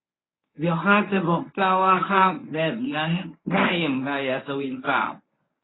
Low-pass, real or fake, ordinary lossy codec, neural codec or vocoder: 7.2 kHz; fake; AAC, 16 kbps; codec, 24 kHz, 0.9 kbps, WavTokenizer, medium speech release version 1